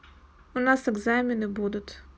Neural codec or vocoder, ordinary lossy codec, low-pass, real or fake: none; none; none; real